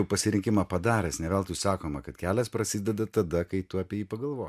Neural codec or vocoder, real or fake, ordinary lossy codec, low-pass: none; real; MP3, 96 kbps; 14.4 kHz